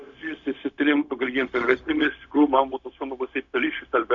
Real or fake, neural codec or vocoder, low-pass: fake; codec, 16 kHz, 0.4 kbps, LongCat-Audio-Codec; 7.2 kHz